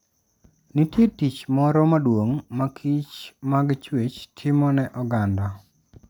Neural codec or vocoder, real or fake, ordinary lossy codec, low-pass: none; real; none; none